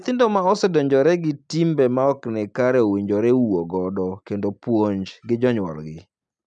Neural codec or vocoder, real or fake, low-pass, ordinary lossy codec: none; real; 10.8 kHz; none